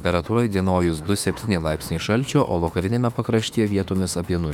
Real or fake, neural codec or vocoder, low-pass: fake; autoencoder, 48 kHz, 32 numbers a frame, DAC-VAE, trained on Japanese speech; 19.8 kHz